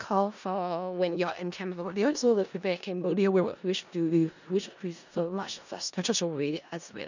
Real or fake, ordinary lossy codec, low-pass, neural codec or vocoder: fake; none; 7.2 kHz; codec, 16 kHz in and 24 kHz out, 0.4 kbps, LongCat-Audio-Codec, four codebook decoder